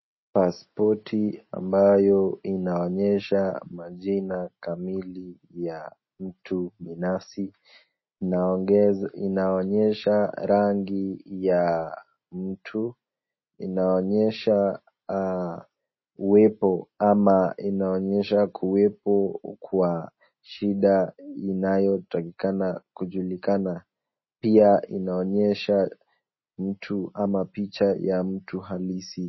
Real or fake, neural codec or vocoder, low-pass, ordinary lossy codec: real; none; 7.2 kHz; MP3, 24 kbps